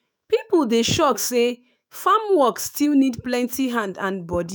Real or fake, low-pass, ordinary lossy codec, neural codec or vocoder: fake; none; none; autoencoder, 48 kHz, 128 numbers a frame, DAC-VAE, trained on Japanese speech